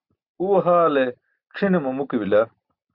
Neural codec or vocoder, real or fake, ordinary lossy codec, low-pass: none; real; Opus, 64 kbps; 5.4 kHz